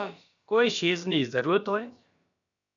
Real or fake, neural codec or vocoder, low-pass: fake; codec, 16 kHz, about 1 kbps, DyCAST, with the encoder's durations; 7.2 kHz